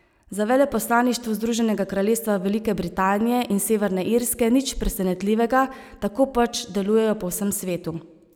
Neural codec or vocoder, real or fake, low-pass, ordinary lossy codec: none; real; none; none